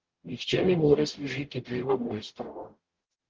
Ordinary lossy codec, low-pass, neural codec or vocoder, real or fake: Opus, 16 kbps; 7.2 kHz; codec, 44.1 kHz, 0.9 kbps, DAC; fake